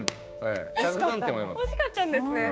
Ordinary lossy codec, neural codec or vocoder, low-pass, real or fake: none; codec, 16 kHz, 6 kbps, DAC; none; fake